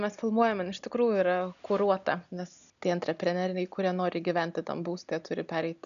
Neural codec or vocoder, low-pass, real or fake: none; 7.2 kHz; real